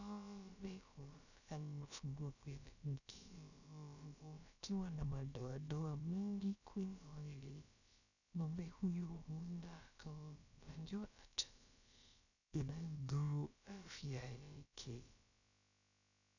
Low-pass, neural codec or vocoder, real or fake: 7.2 kHz; codec, 16 kHz, about 1 kbps, DyCAST, with the encoder's durations; fake